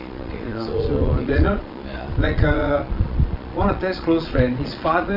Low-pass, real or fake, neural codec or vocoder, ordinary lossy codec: 5.4 kHz; fake; vocoder, 22.05 kHz, 80 mel bands, Vocos; none